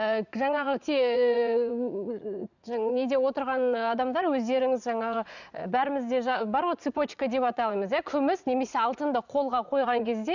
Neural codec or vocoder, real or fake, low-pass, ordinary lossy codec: vocoder, 44.1 kHz, 80 mel bands, Vocos; fake; 7.2 kHz; none